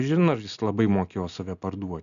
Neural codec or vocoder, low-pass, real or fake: none; 7.2 kHz; real